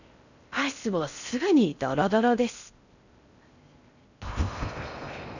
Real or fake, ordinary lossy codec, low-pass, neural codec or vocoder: fake; none; 7.2 kHz; codec, 16 kHz in and 24 kHz out, 0.6 kbps, FocalCodec, streaming, 4096 codes